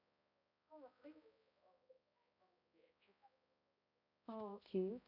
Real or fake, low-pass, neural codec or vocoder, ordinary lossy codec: fake; 5.4 kHz; codec, 16 kHz, 0.5 kbps, X-Codec, HuBERT features, trained on balanced general audio; none